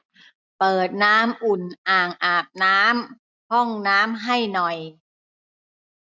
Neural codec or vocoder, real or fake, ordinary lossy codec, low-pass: none; real; none; none